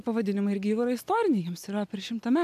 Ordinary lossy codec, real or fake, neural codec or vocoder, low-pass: AAC, 96 kbps; real; none; 14.4 kHz